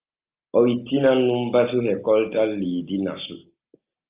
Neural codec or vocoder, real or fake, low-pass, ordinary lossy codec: none; real; 3.6 kHz; Opus, 24 kbps